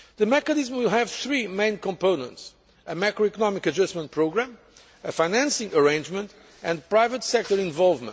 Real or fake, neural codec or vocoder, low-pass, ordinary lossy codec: real; none; none; none